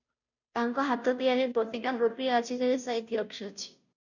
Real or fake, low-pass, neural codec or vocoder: fake; 7.2 kHz; codec, 16 kHz, 0.5 kbps, FunCodec, trained on Chinese and English, 25 frames a second